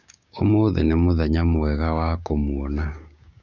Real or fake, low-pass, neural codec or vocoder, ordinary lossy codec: fake; 7.2 kHz; codec, 16 kHz, 6 kbps, DAC; none